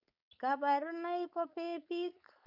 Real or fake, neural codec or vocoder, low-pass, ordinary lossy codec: fake; codec, 16 kHz, 4.8 kbps, FACodec; 5.4 kHz; none